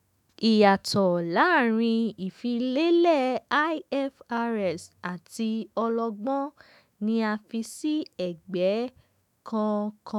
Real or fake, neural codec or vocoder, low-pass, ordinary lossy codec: fake; autoencoder, 48 kHz, 128 numbers a frame, DAC-VAE, trained on Japanese speech; 19.8 kHz; none